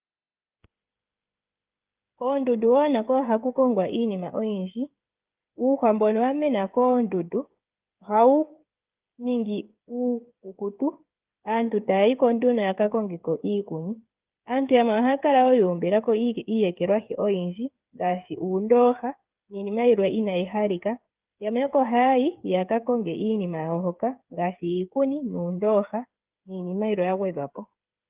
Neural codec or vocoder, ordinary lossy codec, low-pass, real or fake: codec, 16 kHz, 8 kbps, FreqCodec, smaller model; Opus, 32 kbps; 3.6 kHz; fake